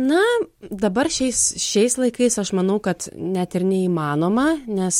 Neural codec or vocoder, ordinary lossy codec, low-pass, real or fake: none; MP3, 64 kbps; 19.8 kHz; real